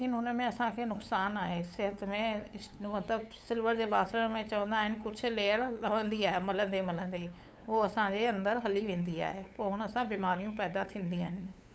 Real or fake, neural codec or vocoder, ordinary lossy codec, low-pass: fake; codec, 16 kHz, 8 kbps, FunCodec, trained on LibriTTS, 25 frames a second; none; none